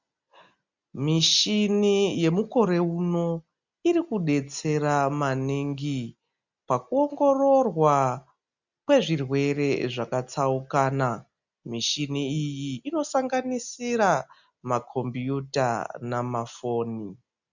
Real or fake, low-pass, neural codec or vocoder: real; 7.2 kHz; none